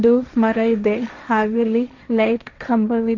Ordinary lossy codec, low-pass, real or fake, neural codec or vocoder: none; 7.2 kHz; fake; codec, 16 kHz, 1.1 kbps, Voila-Tokenizer